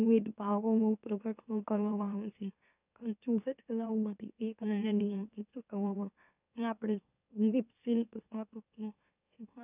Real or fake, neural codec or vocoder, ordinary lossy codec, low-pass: fake; autoencoder, 44.1 kHz, a latent of 192 numbers a frame, MeloTTS; none; 3.6 kHz